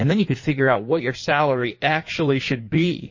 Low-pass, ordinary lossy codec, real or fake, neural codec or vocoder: 7.2 kHz; MP3, 32 kbps; fake; codec, 16 kHz in and 24 kHz out, 1.1 kbps, FireRedTTS-2 codec